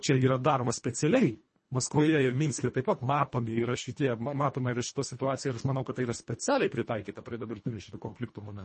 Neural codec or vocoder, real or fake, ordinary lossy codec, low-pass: codec, 24 kHz, 1.5 kbps, HILCodec; fake; MP3, 32 kbps; 10.8 kHz